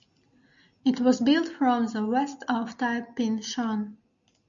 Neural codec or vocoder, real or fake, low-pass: none; real; 7.2 kHz